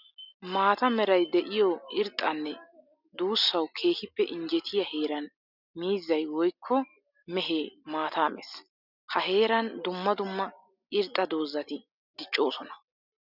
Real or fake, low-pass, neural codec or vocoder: real; 5.4 kHz; none